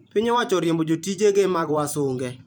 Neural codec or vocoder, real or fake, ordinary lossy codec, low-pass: vocoder, 44.1 kHz, 128 mel bands every 512 samples, BigVGAN v2; fake; none; none